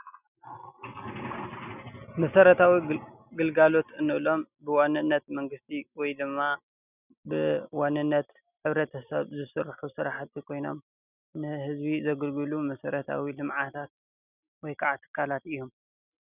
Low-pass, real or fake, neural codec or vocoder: 3.6 kHz; real; none